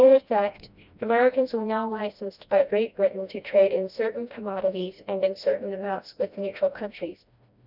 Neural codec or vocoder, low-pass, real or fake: codec, 16 kHz, 1 kbps, FreqCodec, smaller model; 5.4 kHz; fake